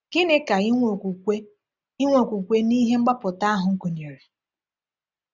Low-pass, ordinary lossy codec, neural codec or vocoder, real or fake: 7.2 kHz; none; none; real